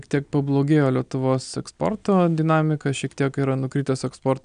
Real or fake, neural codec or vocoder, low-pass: real; none; 9.9 kHz